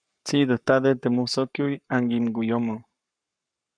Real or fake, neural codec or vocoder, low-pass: fake; codec, 44.1 kHz, 7.8 kbps, Pupu-Codec; 9.9 kHz